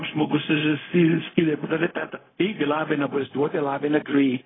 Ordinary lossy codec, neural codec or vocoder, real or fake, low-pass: AAC, 16 kbps; codec, 16 kHz, 0.4 kbps, LongCat-Audio-Codec; fake; 7.2 kHz